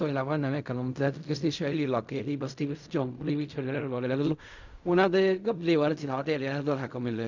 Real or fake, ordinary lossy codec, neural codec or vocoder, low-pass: fake; none; codec, 16 kHz in and 24 kHz out, 0.4 kbps, LongCat-Audio-Codec, fine tuned four codebook decoder; 7.2 kHz